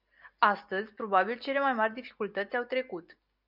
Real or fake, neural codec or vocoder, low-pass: real; none; 5.4 kHz